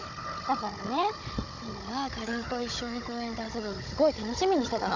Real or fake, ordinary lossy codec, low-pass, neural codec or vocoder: fake; Opus, 64 kbps; 7.2 kHz; codec, 16 kHz, 16 kbps, FunCodec, trained on Chinese and English, 50 frames a second